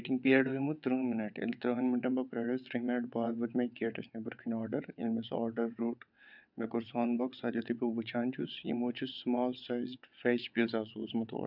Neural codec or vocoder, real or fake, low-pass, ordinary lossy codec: vocoder, 22.05 kHz, 80 mel bands, WaveNeXt; fake; 5.4 kHz; none